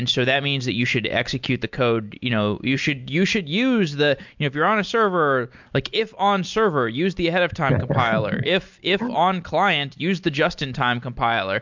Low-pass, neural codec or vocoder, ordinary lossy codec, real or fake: 7.2 kHz; none; MP3, 64 kbps; real